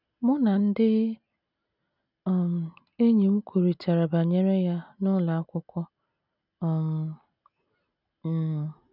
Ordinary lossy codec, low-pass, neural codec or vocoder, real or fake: none; 5.4 kHz; none; real